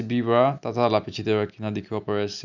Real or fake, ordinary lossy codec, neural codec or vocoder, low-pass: real; none; none; 7.2 kHz